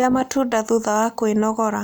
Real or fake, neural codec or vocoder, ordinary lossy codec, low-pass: real; none; none; none